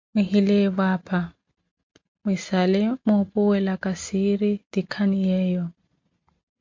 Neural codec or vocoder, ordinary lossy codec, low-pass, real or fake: none; MP3, 48 kbps; 7.2 kHz; real